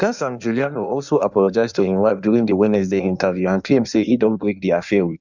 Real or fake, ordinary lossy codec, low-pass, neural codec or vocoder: fake; none; 7.2 kHz; codec, 16 kHz in and 24 kHz out, 1.1 kbps, FireRedTTS-2 codec